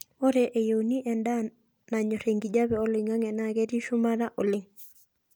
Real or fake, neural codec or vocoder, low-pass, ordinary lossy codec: real; none; none; none